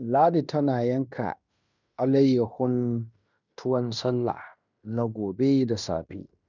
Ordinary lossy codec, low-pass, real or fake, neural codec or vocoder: none; 7.2 kHz; fake; codec, 16 kHz in and 24 kHz out, 0.9 kbps, LongCat-Audio-Codec, fine tuned four codebook decoder